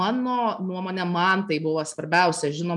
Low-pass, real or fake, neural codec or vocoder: 10.8 kHz; real; none